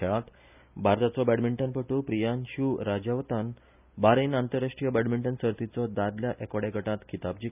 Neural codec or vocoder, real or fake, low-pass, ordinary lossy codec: none; real; 3.6 kHz; none